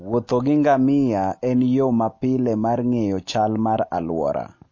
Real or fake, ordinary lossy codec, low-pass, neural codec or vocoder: real; MP3, 32 kbps; 7.2 kHz; none